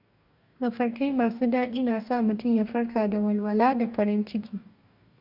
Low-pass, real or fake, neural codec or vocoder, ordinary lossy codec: 5.4 kHz; fake; codec, 44.1 kHz, 2.6 kbps, DAC; none